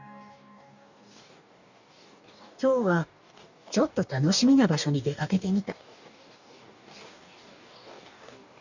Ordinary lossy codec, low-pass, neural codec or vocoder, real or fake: none; 7.2 kHz; codec, 44.1 kHz, 2.6 kbps, DAC; fake